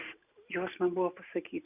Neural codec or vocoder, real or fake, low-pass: none; real; 3.6 kHz